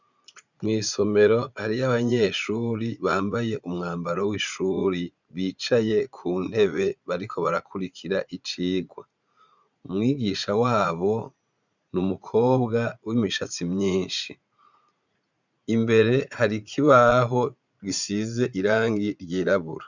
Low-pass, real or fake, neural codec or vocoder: 7.2 kHz; fake; vocoder, 44.1 kHz, 80 mel bands, Vocos